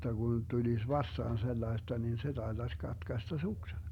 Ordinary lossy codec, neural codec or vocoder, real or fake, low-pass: none; none; real; 19.8 kHz